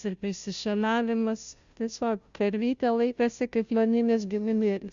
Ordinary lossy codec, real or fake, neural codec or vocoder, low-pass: Opus, 64 kbps; fake; codec, 16 kHz, 0.5 kbps, FunCodec, trained on Chinese and English, 25 frames a second; 7.2 kHz